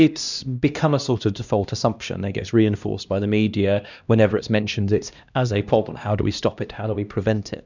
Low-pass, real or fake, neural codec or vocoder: 7.2 kHz; fake; codec, 16 kHz, 1 kbps, X-Codec, HuBERT features, trained on LibriSpeech